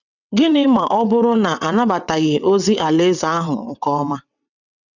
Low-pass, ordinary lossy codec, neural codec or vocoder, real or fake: 7.2 kHz; none; vocoder, 22.05 kHz, 80 mel bands, WaveNeXt; fake